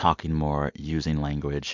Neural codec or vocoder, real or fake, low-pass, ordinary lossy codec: none; real; 7.2 kHz; MP3, 64 kbps